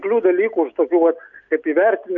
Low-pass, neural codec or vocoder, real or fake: 7.2 kHz; none; real